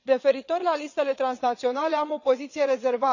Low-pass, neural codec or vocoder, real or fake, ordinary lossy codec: 7.2 kHz; vocoder, 22.05 kHz, 80 mel bands, WaveNeXt; fake; none